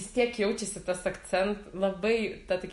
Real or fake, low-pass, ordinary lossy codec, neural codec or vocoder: real; 14.4 kHz; MP3, 48 kbps; none